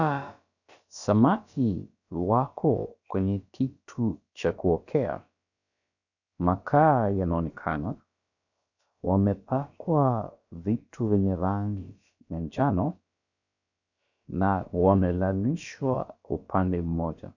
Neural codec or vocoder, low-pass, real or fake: codec, 16 kHz, about 1 kbps, DyCAST, with the encoder's durations; 7.2 kHz; fake